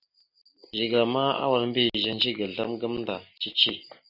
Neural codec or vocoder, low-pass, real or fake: none; 5.4 kHz; real